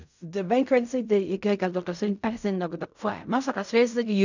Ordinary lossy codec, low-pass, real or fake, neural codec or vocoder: none; 7.2 kHz; fake; codec, 16 kHz in and 24 kHz out, 0.4 kbps, LongCat-Audio-Codec, fine tuned four codebook decoder